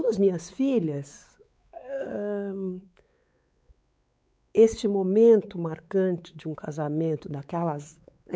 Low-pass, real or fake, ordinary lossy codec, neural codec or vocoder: none; fake; none; codec, 16 kHz, 4 kbps, X-Codec, WavLM features, trained on Multilingual LibriSpeech